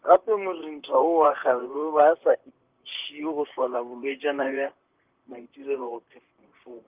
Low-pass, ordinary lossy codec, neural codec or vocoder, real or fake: 3.6 kHz; Opus, 32 kbps; vocoder, 44.1 kHz, 128 mel bands, Pupu-Vocoder; fake